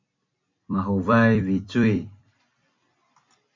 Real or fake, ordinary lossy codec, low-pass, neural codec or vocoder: fake; MP3, 64 kbps; 7.2 kHz; vocoder, 44.1 kHz, 128 mel bands every 256 samples, BigVGAN v2